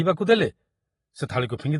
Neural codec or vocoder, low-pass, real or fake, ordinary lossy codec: none; 10.8 kHz; real; AAC, 32 kbps